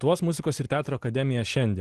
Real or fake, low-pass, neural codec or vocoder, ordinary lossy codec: real; 10.8 kHz; none; Opus, 16 kbps